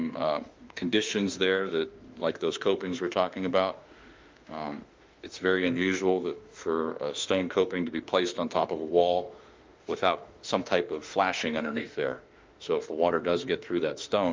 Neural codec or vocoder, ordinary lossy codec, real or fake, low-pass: autoencoder, 48 kHz, 32 numbers a frame, DAC-VAE, trained on Japanese speech; Opus, 24 kbps; fake; 7.2 kHz